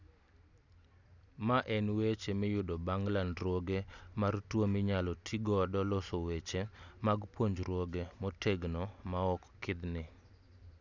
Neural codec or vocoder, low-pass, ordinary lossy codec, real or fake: none; 7.2 kHz; none; real